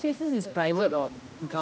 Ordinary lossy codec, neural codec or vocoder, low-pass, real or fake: none; codec, 16 kHz, 1 kbps, X-Codec, HuBERT features, trained on general audio; none; fake